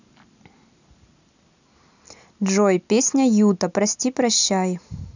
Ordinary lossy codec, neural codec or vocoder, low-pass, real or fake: none; none; 7.2 kHz; real